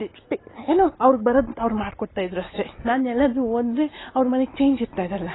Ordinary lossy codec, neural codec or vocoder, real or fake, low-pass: AAC, 16 kbps; none; real; 7.2 kHz